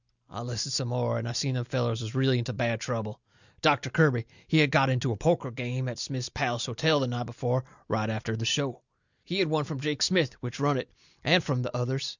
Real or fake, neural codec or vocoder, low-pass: real; none; 7.2 kHz